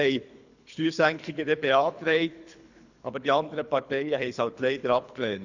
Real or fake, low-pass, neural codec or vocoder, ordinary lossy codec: fake; 7.2 kHz; codec, 24 kHz, 3 kbps, HILCodec; none